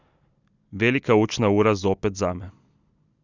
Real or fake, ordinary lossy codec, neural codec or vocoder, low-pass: real; none; none; 7.2 kHz